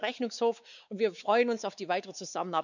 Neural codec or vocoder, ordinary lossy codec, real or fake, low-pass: codec, 16 kHz, 4 kbps, X-Codec, WavLM features, trained on Multilingual LibriSpeech; none; fake; 7.2 kHz